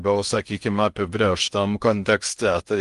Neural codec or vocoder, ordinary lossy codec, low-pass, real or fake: codec, 16 kHz in and 24 kHz out, 0.6 kbps, FocalCodec, streaming, 2048 codes; Opus, 32 kbps; 10.8 kHz; fake